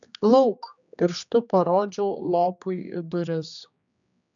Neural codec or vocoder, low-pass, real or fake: codec, 16 kHz, 2 kbps, X-Codec, HuBERT features, trained on general audio; 7.2 kHz; fake